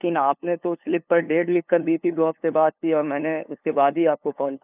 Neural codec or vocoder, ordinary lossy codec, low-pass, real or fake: codec, 16 kHz, 2 kbps, FunCodec, trained on LibriTTS, 25 frames a second; none; 3.6 kHz; fake